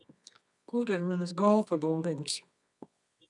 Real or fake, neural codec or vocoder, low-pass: fake; codec, 24 kHz, 0.9 kbps, WavTokenizer, medium music audio release; 10.8 kHz